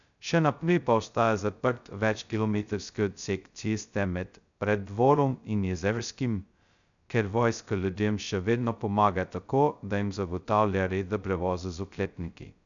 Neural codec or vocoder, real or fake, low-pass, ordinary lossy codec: codec, 16 kHz, 0.2 kbps, FocalCodec; fake; 7.2 kHz; none